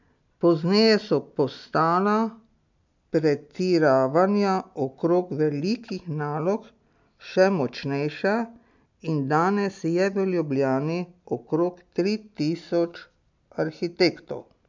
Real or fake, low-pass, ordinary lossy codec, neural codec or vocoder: real; 7.2 kHz; MP3, 64 kbps; none